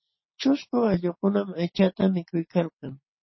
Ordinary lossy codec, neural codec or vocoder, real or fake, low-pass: MP3, 24 kbps; none; real; 7.2 kHz